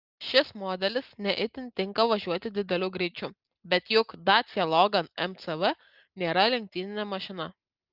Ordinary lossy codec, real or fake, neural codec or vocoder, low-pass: Opus, 32 kbps; real; none; 5.4 kHz